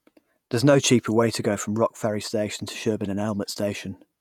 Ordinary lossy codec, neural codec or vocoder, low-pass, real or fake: none; vocoder, 48 kHz, 128 mel bands, Vocos; 19.8 kHz; fake